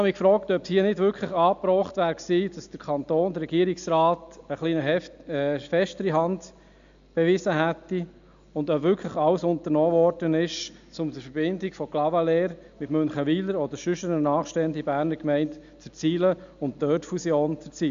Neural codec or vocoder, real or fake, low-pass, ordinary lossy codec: none; real; 7.2 kHz; MP3, 64 kbps